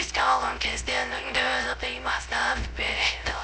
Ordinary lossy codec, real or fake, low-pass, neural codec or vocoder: none; fake; none; codec, 16 kHz, 0.3 kbps, FocalCodec